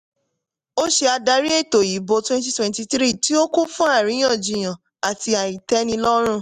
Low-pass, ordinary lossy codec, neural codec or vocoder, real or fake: 14.4 kHz; MP3, 96 kbps; none; real